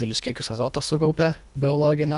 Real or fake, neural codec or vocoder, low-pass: fake; codec, 24 kHz, 1.5 kbps, HILCodec; 10.8 kHz